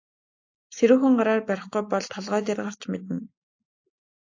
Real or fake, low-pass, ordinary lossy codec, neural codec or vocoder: real; 7.2 kHz; AAC, 48 kbps; none